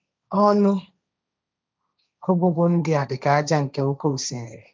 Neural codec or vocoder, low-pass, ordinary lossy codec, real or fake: codec, 16 kHz, 1.1 kbps, Voila-Tokenizer; none; none; fake